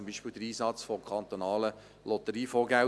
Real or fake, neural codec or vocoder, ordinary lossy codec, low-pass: real; none; none; none